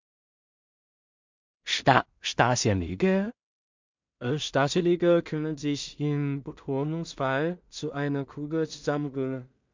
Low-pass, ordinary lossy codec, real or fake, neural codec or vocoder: 7.2 kHz; MP3, 64 kbps; fake; codec, 16 kHz in and 24 kHz out, 0.4 kbps, LongCat-Audio-Codec, two codebook decoder